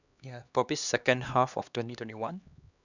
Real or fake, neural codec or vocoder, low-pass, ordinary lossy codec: fake; codec, 16 kHz, 2 kbps, X-Codec, HuBERT features, trained on LibriSpeech; 7.2 kHz; none